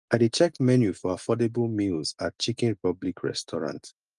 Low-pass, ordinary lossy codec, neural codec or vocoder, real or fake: 10.8 kHz; Opus, 32 kbps; none; real